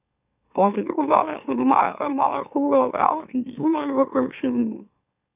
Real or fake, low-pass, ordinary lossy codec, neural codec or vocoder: fake; 3.6 kHz; none; autoencoder, 44.1 kHz, a latent of 192 numbers a frame, MeloTTS